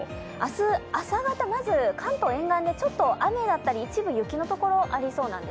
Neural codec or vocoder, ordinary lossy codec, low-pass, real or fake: none; none; none; real